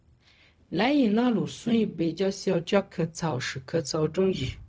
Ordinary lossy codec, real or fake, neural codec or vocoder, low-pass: none; fake; codec, 16 kHz, 0.4 kbps, LongCat-Audio-Codec; none